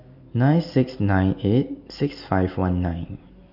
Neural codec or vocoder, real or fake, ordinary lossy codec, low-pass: none; real; none; 5.4 kHz